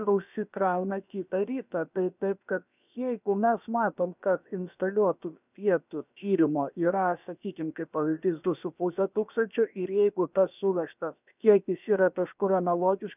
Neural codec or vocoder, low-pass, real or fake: codec, 16 kHz, about 1 kbps, DyCAST, with the encoder's durations; 3.6 kHz; fake